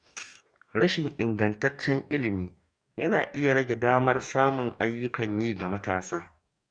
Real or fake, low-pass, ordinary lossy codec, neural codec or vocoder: fake; 9.9 kHz; none; codec, 44.1 kHz, 2.6 kbps, DAC